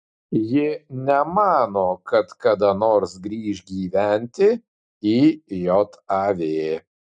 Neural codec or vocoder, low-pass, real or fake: none; 9.9 kHz; real